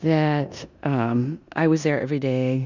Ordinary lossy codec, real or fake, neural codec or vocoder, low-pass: Opus, 64 kbps; fake; codec, 16 kHz in and 24 kHz out, 0.9 kbps, LongCat-Audio-Codec, four codebook decoder; 7.2 kHz